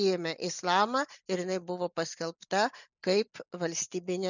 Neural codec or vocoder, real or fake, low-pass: none; real; 7.2 kHz